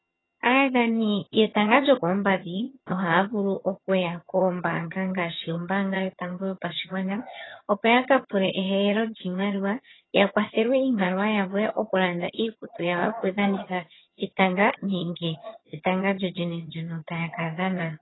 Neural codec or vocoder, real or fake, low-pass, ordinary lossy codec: vocoder, 22.05 kHz, 80 mel bands, HiFi-GAN; fake; 7.2 kHz; AAC, 16 kbps